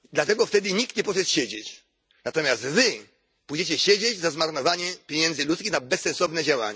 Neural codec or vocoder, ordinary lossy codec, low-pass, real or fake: none; none; none; real